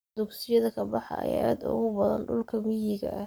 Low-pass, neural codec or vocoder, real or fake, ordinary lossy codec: none; codec, 44.1 kHz, 7.8 kbps, Pupu-Codec; fake; none